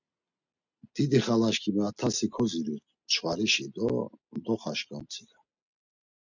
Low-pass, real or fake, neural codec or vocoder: 7.2 kHz; real; none